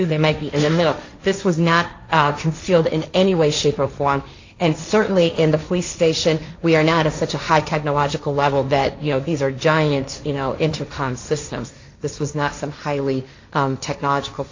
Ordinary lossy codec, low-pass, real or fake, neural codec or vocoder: AAC, 48 kbps; 7.2 kHz; fake; codec, 16 kHz, 1.1 kbps, Voila-Tokenizer